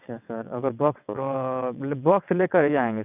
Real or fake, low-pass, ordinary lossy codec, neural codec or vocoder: fake; 3.6 kHz; none; vocoder, 22.05 kHz, 80 mel bands, WaveNeXt